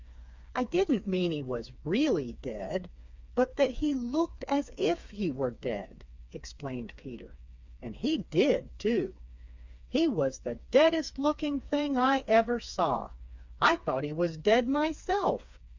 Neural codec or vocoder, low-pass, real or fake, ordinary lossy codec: codec, 16 kHz, 4 kbps, FreqCodec, smaller model; 7.2 kHz; fake; MP3, 64 kbps